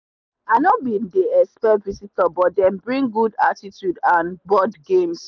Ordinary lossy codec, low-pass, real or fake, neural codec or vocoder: none; 7.2 kHz; real; none